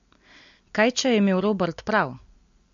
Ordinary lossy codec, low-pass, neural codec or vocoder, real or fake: MP3, 48 kbps; 7.2 kHz; none; real